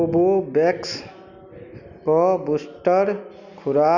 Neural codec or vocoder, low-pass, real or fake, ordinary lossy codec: none; 7.2 kHz; real; none